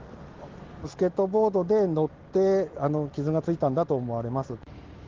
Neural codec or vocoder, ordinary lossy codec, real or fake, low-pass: none; Opus, 16 kbps; real; 7.2 kHz